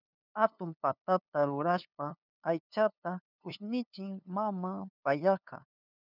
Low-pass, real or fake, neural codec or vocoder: 5.4 kHz; fake; codec, 16 kHz, 2 kbps, FunCodec, trained on LibriTTS, 25 frames a second